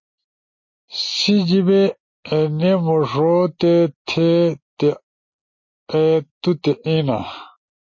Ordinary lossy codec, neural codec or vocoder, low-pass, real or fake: MP3, 32 kbps; none; 7.2 kHz; real